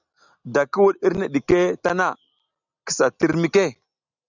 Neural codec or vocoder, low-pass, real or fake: none; 7.2 kHz; real